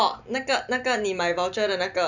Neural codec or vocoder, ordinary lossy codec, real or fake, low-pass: none; none; real; 7.2 kHz